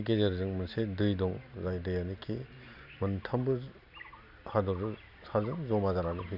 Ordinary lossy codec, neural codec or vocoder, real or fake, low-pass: none; none; real; 5.4 kHz